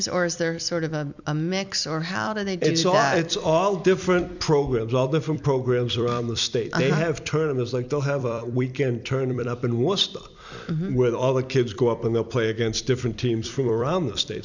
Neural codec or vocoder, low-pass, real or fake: vocoder, 44.1 kHz, 128 mel bands every 256 samples, BigVGAN v2; 7.2 kHz; fake